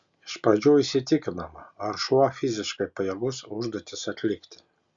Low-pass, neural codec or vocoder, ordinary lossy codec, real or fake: 7.2 kHz; none; Opus, 64 kbps; real